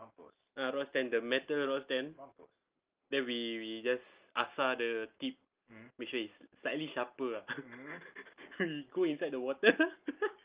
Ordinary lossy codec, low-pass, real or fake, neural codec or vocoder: Opus, 24 kbps; 3.6 kHz; real; none